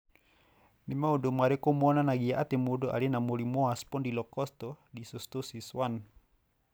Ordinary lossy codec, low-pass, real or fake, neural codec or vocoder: none; none; real; none